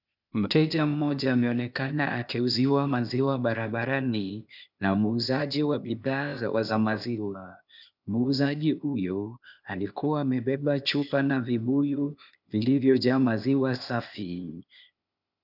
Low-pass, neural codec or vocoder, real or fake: 5.4 kHz; codec, 16 kHz, 0.8 kbps, ZipCodec; fake